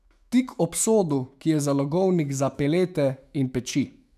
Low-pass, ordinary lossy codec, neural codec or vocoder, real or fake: 14.4 kHz; none; autoencoder, 48 kHz, 128 numbers a frame, DAC-VAE, trained on Japanese speech; fake